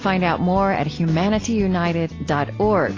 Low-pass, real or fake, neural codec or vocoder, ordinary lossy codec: 7.2 kHz; real; none; AAC, 32 kbps